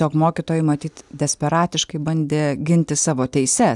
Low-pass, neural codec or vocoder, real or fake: 10.8 kHz; none; real